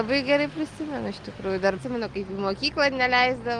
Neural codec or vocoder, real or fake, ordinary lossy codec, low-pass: none; real; Opus, 24 kbps; 10.8 kHz